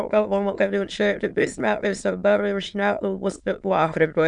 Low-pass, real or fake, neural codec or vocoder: 9.9 kHz; fake; autoencoder, 22.05 kHz, a latent of 192 numbers a frame, VITS, trained on many speakers